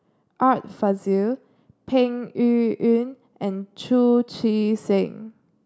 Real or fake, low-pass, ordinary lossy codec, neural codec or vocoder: real; none; none; none